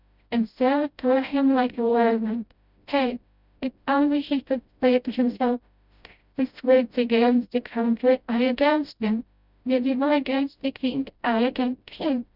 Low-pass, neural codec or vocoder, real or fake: 5.4 kHz; codec, 16 kHz, 0.5 kbps, FreqCodec, smaller model; fake